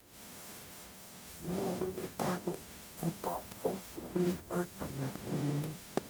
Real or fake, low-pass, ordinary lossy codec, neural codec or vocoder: fake; none; none; codec, 44.1 kHz, 0.9 kbps, DAC